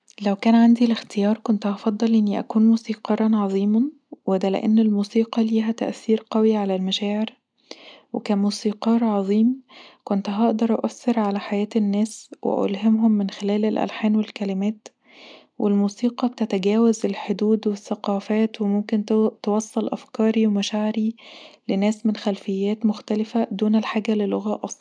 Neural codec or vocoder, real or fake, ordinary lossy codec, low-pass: none; real; none; 9.9 kHz